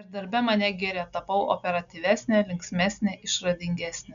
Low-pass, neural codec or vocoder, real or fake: 7.2 kHz; none; real